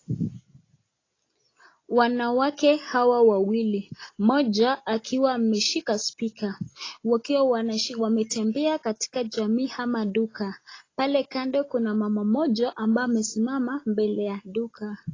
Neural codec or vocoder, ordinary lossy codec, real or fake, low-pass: none; AAC, 32 kbps; real; 7.2 kHz